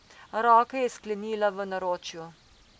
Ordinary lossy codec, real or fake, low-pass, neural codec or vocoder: none; real; none; none